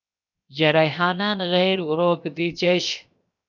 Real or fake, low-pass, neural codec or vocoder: fake; 7.2 kHz; codec, 16 kHz, 0.7 kbps, FocalCodec